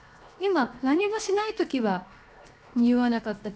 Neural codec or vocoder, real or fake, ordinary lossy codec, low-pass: codec, 16 kHz, 0.7 kbps, FocalCodec; fake; none; none